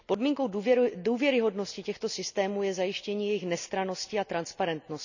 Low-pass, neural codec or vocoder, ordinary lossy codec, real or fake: 7.2 kHz; none; none; real